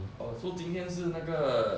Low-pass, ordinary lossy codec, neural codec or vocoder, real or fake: none; none; none; real